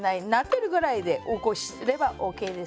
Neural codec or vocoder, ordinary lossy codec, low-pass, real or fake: none; none; none; real